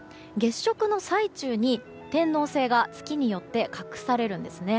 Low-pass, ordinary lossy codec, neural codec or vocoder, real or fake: none; none; none; real